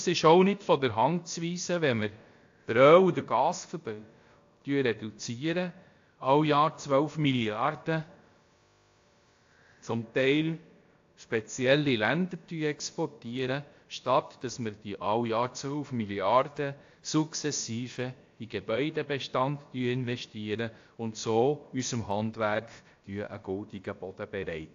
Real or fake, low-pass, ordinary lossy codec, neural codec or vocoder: fake; 7.2 kHz; AAC, 48 kbps; codec, 16 kHz, about 1 kbps, DyCAST, with the encoder's durations